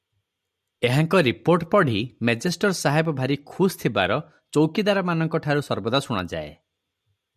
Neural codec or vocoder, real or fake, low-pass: none; real; 14.4 kHz